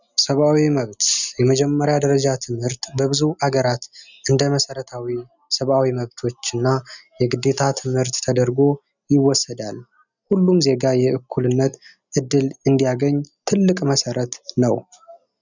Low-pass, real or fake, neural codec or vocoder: 7.2 kHz; real; none